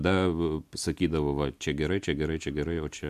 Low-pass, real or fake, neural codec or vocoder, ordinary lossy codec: 14.4 kHz; real; none; MP3, 96 kbps